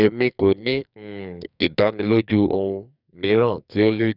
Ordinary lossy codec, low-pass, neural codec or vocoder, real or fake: none; 5.4 kHz; codec, 44.1 kHz, 2.6 kbps, SNAC; fake